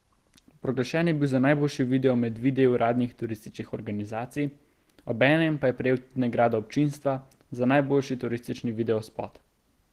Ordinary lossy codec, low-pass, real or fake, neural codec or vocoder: Opus, 16 kbps; 14.4 kHz; real; none